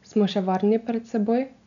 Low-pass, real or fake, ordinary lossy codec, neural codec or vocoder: 7.2 kHz; real; none; none